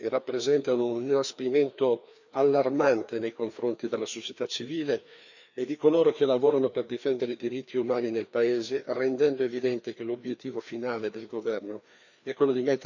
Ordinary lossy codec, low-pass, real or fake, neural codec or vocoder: none; 7.2 kHz; fake; codec, 16 kHz, 2 kbps, FreqCodec, larger model